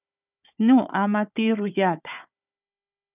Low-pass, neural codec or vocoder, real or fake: 3.6 kHz; codec, 16 kHz, 4 kbps, FunCodec, trained on Chinese and English, 50 frames a second; fake